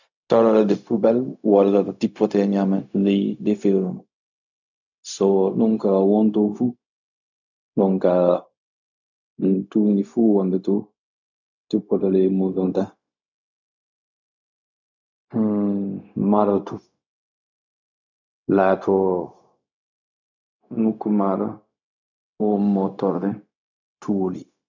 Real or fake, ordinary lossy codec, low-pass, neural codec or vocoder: fake; none; 7.2 kHz; codec, 16 kHz, 0.4 kbps, LongCat-Audio-Codec